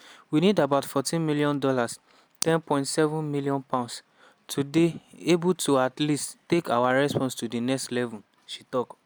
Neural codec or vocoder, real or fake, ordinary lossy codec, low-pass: none; real; none; none